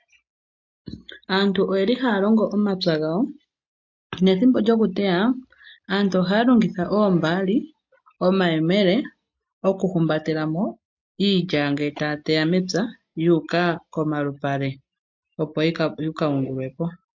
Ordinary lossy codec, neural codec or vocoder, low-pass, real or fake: MP3, 48 kbps; none; 7.2 kHz; real